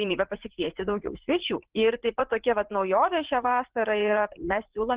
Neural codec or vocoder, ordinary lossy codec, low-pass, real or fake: vocoder, 44.1 kHz, 80 mel bands, Vocos; Opus, 16 kbps; 3.6 kHz; fake